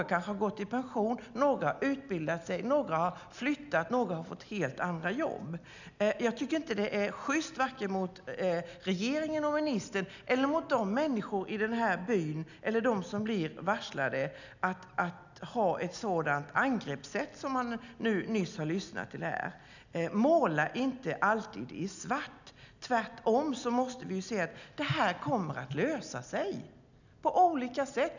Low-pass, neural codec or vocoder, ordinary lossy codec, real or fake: 7.2 kHz; none; none; real